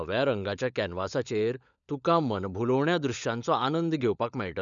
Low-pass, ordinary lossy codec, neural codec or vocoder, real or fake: 7.2 kHz; none; codec, 16 kHz, 16 kbps, FunCodec, trained on LibriTTS, 50 frames a second; fake